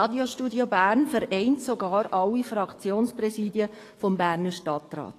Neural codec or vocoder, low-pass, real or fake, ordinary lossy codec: codec, 44.1 kHz, 7.8 kbps, DAC; 14.4 kHz; fake; AAC, 48 kbps